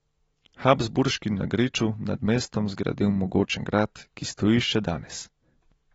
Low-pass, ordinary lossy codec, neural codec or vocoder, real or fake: 19.8 kHz; AAC, 24 kbps; none; real